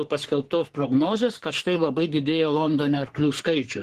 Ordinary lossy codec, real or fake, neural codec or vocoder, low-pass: Opus, 16 kbps; fake; codec, 44.1 kHz, 3.4 kbps, Pupu-Codec; 14.4 kHz